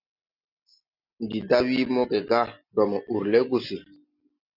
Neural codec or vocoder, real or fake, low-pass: none; real; 5.4 kHz